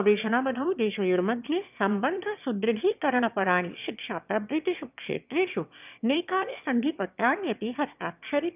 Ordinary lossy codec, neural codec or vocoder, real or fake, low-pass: none; autoencoder, 22.05 kHz, a latent of 192 numbers a frame, VITS, trained on one speaker; fake; 3.6 kHz